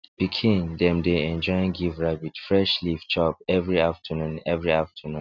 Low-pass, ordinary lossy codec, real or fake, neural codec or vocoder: 7.2 kHz; none; real; none